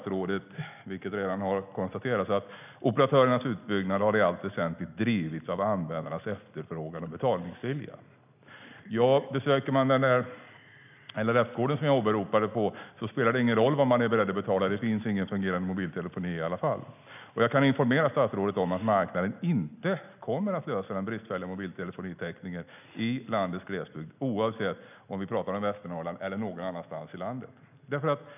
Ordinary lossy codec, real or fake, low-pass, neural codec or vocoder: none; real; 3.6 kHz; none